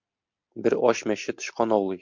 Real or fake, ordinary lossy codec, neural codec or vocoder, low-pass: real; MP3, 48 kbps; none; 7.2 kHz